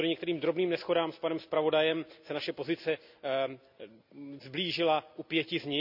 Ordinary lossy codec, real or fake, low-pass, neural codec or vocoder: none; real; 5.4 kHz; none